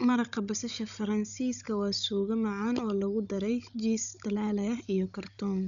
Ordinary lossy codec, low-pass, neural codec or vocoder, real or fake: none; 7.2 kHz; codec, 16 kHz, 16 kbps, FunCodec, trained on Chinese and English, 50 frames a second; fake